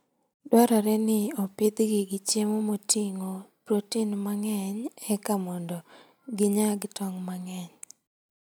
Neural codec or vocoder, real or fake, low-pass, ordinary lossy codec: none; real; none; none